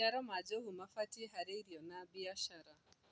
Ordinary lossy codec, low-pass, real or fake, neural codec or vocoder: none; none; real; none